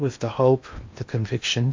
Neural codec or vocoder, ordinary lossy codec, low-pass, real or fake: codec, 16 kHz in and 24 kHz out, 0.8 kbps, FocalCodec, streaming, 65536 codes; MP3, 48 kbps; 7.2 kHz; fake